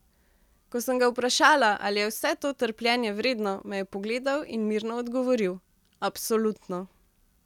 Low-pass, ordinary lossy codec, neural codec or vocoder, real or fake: 19.8 kHz; none; none; real